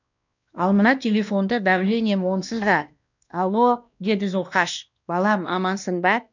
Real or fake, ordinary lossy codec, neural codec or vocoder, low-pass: fake; none; codec, 16 kHz, 1 kbps, X-Codec, WavLM features, trained on Multilingual LibriSpeech; 7.2 kHz